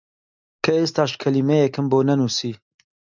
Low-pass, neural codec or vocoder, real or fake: 7.2 kHz; none; real